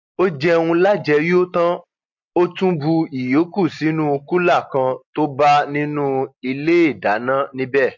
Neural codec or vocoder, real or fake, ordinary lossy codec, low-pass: none; real; MP3, 48 kbps; 7.2 kHz